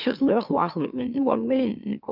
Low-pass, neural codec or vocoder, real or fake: 5.4 kHz; autoencoder, 44.1 kHz, a latent of 192 numbers a frame, MeloTTS; fake